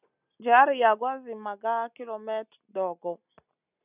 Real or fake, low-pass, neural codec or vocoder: real; 3.6 kHz; none